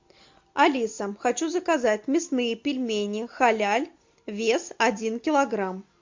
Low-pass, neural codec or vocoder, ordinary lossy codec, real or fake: 7.2 kHz; none; MP3, 48 kbps; real